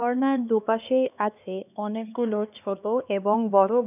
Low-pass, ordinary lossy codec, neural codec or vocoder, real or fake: 3.6 kHz; none; codec, 16 kHz, 2 kbps, X-Codec, HuBERT features, trained on LibriSpeech; fake